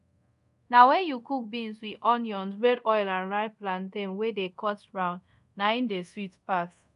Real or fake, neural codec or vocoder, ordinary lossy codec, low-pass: fake; codec, 24 kHz, 0.5 kbps, DualCodec; none; 10.8 kHz